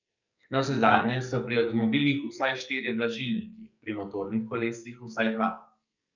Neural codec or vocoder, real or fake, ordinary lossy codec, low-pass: codec, 44.1 kHz, 2.6 kbps, SNAC; fake; none; 7.2 kHz